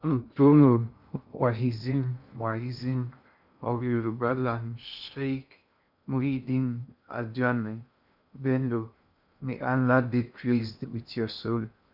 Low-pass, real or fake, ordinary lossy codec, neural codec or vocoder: 5.4 kHz; fake; none; codec, 16 kHz in and 24 kHz out, 0.6 kbps, FocalCodec, streaming, 2048 codes